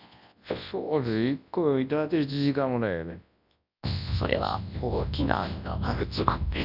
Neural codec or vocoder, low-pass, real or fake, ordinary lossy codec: codec, 24 kHz, 0.9 kbps, WavTokenizer, large speech release; 5.4 kHz; fake; none